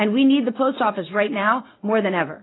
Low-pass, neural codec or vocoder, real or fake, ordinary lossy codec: 7.2 kHz; none; real; AAC, 16 kbps